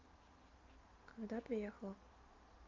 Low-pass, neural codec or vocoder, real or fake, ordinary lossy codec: 7.2 kHz; none; real; Opus, 24 kbps